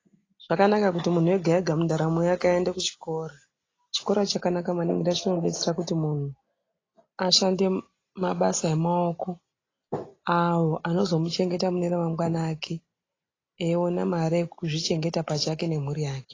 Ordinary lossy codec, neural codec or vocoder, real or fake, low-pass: AAC, 32 kbps; none; real; 7.2 kHz